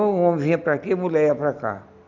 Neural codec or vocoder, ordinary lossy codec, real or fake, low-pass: none; none; real; 7.2 kHz